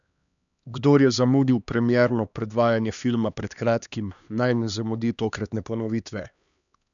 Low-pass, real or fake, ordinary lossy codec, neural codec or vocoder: 7.2 kHz; fake; none; codec, 16 kHz, 2 kbps, X-Codec, HuBERT features, trained on LibriSpeech